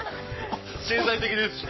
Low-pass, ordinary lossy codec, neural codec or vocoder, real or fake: 7.2 kHz; MP3, 24 kbps; codec, 44.1 kHz, 7.8 kbps, Pupu-Codec; fake